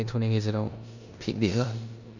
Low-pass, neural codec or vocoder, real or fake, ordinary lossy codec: 7.2 kHz; codec, 16 kHz in and 24 kHz out, 0.9 kbps, LongCat-Audio-Codec, four codebook decoder; fake; none